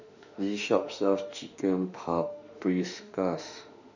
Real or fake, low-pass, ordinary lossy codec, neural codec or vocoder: fake; 7.2 kHz; none; autoencoder, 48 kHz, 32 numbers a frame, DAC-VAE, trained on Japanese speech